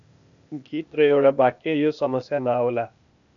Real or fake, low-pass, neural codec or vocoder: fake; 7.2 kHz; codec, 16 kHz, 0.8 kbps, ZipCodec